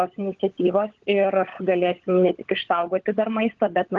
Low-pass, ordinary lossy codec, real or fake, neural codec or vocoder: 7.2 kHz; Opus, 16 kbps; fake; codec, 16 kHz, 16 kbps, FunCodec, trained on LibriTTS, 50 frames a second